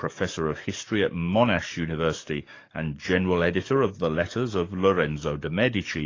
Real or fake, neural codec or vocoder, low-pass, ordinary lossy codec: fake; codec, 44.1 kHz, 7.8 kbps, DAC; 7.2 kHz; AAC, 32 kbps